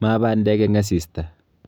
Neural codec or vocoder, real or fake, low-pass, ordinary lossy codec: none; real; none; none